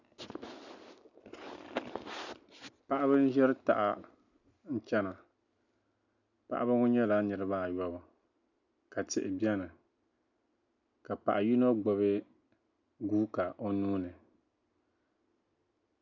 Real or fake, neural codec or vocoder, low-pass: real; none; 7.2 kHz